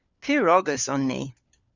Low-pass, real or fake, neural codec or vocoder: 7.2 kHz; fake; codec, 16 kHz, 4 kbps, FunCodec, trained on LibriTTS, 50 frames a second